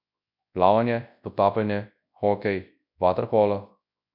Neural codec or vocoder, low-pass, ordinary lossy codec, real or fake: codec, 24 kHz, 0.9 kbps, WavTokenizer, large speech release; 5.4 kHz; none; fake